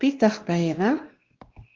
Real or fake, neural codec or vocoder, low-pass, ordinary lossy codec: fake; codec, 16 kHz, 1 kbps, X-Codec, WavLM features, trained on Multilingual LibriSpeech; 7.2 kHz; Opus, 16 kbps